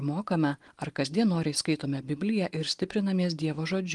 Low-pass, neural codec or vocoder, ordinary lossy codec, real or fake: 10.8 kHz; none; Opus, 24 kbps; real